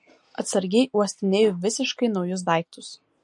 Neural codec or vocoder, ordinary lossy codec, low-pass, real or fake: none; MP3, 48 kbps; 10.8 kHz; real